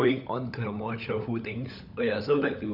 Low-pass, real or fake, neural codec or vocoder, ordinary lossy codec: 5.4 kHz; fake; codec, 16 kHz, 16 kbps, FunCodec, trained on LibriTTS, 50 frames a second; none